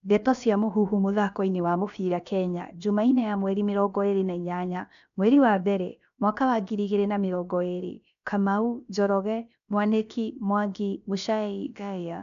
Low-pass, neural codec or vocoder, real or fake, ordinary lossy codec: 7.2 kHz; codec, 16 kHz, about 1 kbps, DyCAST, with the encoder's durations; fake; none